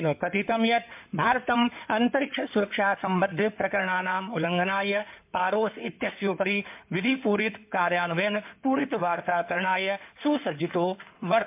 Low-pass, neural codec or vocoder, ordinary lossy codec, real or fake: 3.6 kHz; codec, 16 kHz in and 24 kHz out, 2.2 kbps, FireRedTTS-2 codec; MP3, 32 kbps; fake